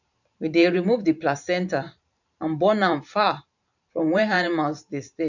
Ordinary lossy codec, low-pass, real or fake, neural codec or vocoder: none; 7.2 kHz; fake; vocoder, 44.1 kHz, 128 mel bands every 512 samples, BigVGAN v2